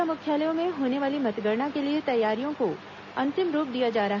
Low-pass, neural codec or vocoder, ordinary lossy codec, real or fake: 7.2 kHz; none; none; real